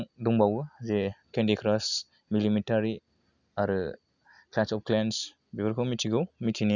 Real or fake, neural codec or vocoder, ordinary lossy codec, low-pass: real; none; none; 7.2 kHz